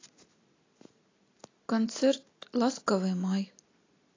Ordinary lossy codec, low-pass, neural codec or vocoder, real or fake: AAC, 32 kbps; 7.2 kHz; none; real